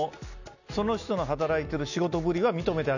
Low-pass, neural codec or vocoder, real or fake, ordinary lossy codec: 7.2 kHz; none; real; none